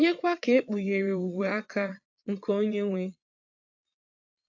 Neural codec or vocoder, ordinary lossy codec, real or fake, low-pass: vocoder, 22.05 kHz, 80 mel bands, Vocos; AAC, 48 kbps; fake; 7.2 kHz